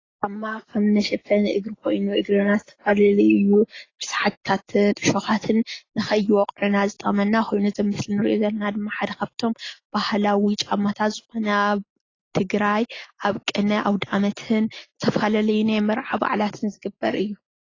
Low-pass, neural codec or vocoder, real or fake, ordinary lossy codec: 7.2 kHz; none; real; AAC, 32 kbps